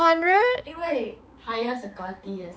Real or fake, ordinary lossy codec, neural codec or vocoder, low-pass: fake; none; codec, 16 kHz, 4 kbps, X-Codec, HuBERT features, trained on balanced general audio; none